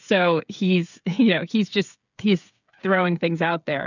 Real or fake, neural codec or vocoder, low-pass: fake; codec, 16 kHz, 8 kbps, FreqCodec, smaller model; 7.2 kHz